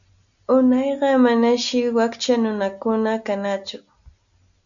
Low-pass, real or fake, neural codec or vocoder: 7.2 kHz; real; none